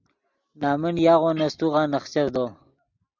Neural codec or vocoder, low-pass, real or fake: none; 7.2 kHz; real